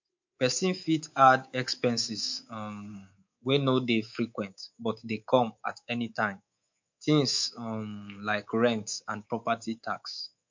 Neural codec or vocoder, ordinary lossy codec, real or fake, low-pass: codec, 24 kHz, 3.1 kbps, DualCodec; MP3, 48 kbps; fake; 7.2 kHz